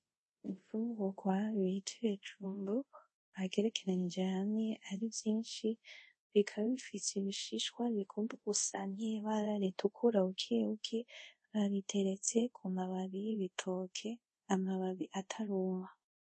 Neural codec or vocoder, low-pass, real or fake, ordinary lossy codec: codec, 24 kHz, 0.5 kbps, DualCodec; 10.8 kHz; fake; MP3, 32 kbps